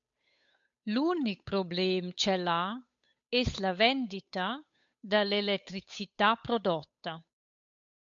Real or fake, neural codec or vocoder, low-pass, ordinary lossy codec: fake; codec, 16 kHz, 8 kbps, FunCodec, trained on Chinese and English, 25 frames a second; 7.2 kHz; MP3, 64 kbps